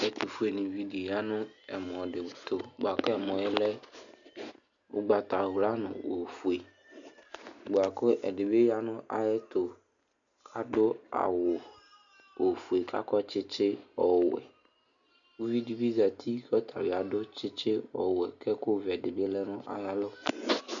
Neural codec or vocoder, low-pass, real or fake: none; 7.2 kHz; real